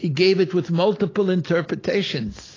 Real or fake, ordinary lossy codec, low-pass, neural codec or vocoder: real; AAC, 32 kbps; 7.2 kHz; none